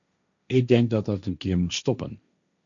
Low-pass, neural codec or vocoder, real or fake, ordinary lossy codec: 7.2 kHz; codec, 16 kHz, 1.1 kbps, Voila-Tokenizer; fake; MP3, 96 kbps